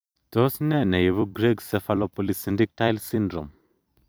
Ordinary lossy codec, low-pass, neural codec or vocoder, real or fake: none; none; vocoder, 44.1 kHz, 128 mel bands every 256 samples, BigVGAN v2; fake